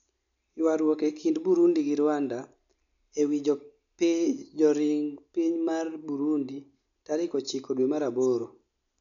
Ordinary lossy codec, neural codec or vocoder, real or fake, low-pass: MP3, 96 kbps; none; real; 7.2 kHz